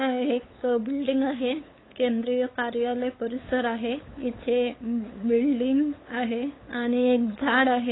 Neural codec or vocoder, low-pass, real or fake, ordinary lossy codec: codec, 16 kHz, 8 kbps, FunCodec, trained on LibriTTS, 25 frames a second; 7.2 kHz; fake; AAC, 16 kbps